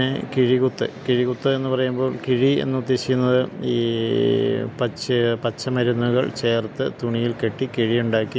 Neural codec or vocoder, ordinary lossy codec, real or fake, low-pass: none; none; real; none